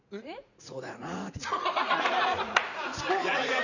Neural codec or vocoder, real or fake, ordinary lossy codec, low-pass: vocoder, 44.1 kHz, 80 mel bands, Vocos; fake; none; 7.2 kHz